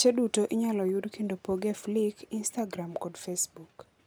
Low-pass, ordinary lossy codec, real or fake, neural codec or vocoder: none; none; real; none